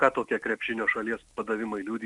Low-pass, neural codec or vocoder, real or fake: 10.8 kHz; none; real